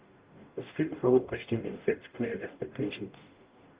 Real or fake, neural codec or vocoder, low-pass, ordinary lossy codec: fake; codec, 44.1 kHz, 0.9 kbps, DAC; 3.6 kHz; Opus, 32 kbps